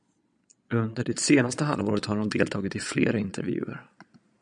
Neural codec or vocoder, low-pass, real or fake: vocoder, 22.05 kHz, 80 mel bands, Vocos; 9.9 kHz; fake